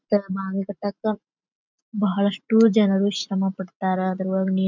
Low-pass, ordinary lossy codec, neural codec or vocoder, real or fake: 7.2 kHz; none; none; real